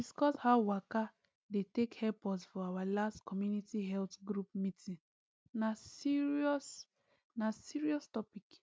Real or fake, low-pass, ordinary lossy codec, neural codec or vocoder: real; none; none; none